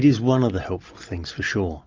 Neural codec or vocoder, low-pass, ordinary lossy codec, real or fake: none; 7.2 kHz; Opus, 32 kbps; real